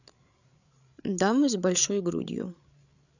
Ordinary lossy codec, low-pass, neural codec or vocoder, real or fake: none; 7.2 kHz; codec, 16 kHz, 8 kbps, FreqCodec, larger model; fake